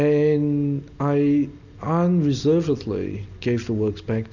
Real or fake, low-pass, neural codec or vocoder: real; 7.2 kHz; none